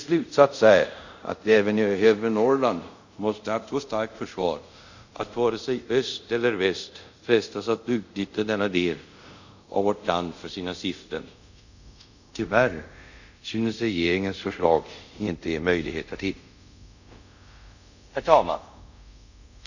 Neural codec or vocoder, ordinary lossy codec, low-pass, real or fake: codec, 24 kHz, 0.5 kbps, DualCodec; none; 7.2 kHz; fake